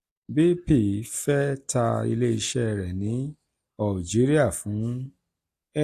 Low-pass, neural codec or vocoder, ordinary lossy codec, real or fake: 14.4 kHz; none; AAC, 64 kbps; real